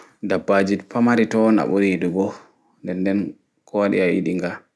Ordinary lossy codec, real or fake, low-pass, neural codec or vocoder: none; real; none; none